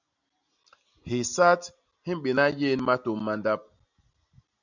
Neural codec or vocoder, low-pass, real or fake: none; 7.2 kHz; real